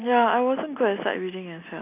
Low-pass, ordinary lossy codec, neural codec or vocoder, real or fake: 3.6 kHz; AAC, 24 kbps; none; real